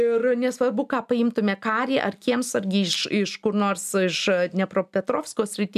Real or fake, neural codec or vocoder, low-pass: real; none; 14.4 kHz